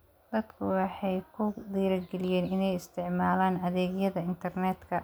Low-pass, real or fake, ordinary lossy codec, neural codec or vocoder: none; real; none; none